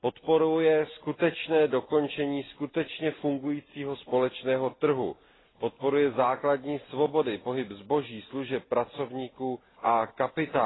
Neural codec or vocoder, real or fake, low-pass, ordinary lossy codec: none; real; 7.2 kHz; AAC, 16 kbps